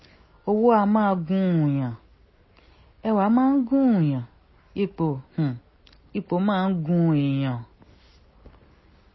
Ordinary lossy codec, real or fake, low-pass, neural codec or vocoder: MP3, 24 kbps; real; 7.2 kHz; none